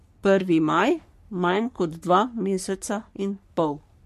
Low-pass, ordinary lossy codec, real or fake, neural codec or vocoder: 14.4 kHz; MP3, 64 kbps; fake; codec, 44.1 kHz, 3.4 kbps, Pupu-Codec